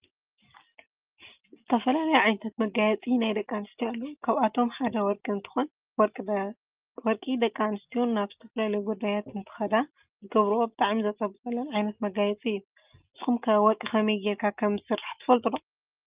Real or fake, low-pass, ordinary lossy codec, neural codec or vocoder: real; 3.6 kHz; Opus, 24 kbps; none